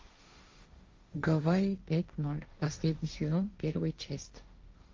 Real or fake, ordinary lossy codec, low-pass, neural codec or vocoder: fake; Opus, 32 kbps; 7.2 kHz; codec, 16 kHz, 1.1 kbps, Voila-Tokenizer